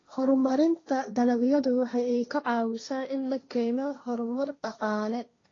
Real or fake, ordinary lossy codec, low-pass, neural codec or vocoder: fake; AAC, 32 kbps; 7.2 kHz; codec, 16 kHz, 1.1 kbps, Voila-Tokenizer